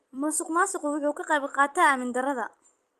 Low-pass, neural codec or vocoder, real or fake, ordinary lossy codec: 14.4 kHz; vocoder, 44.1 kHz, 128 mel bands every 256 samples, BigVGAN v2; fake; Opus, 32 kbps